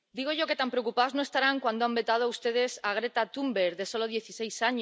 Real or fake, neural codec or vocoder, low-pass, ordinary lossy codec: real; none; none; none